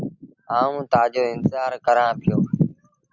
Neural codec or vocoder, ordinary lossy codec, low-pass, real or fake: none; Opus, 64 kbps; 7.2 kHz; real